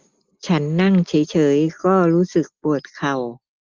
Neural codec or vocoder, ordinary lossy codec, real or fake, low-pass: none; Opus, 24 kbps; real; 7.2 kHz